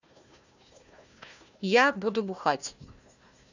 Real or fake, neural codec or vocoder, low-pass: fake; codec, 16 kHz, 1 kbps, FunCodec, trained on Chinese and English, 50 frames a second; 7.2 kHz